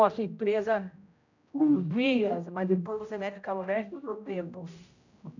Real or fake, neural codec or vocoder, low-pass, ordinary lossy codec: fake; codec, 16 kHz, 0.5 kbps, X-Codec, HuBERT features, trained on general audio; 7.2 kHz; none